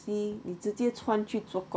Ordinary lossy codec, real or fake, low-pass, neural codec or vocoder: none; real; none; none